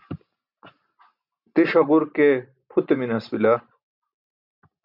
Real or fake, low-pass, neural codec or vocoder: real; 5.4 kHz; none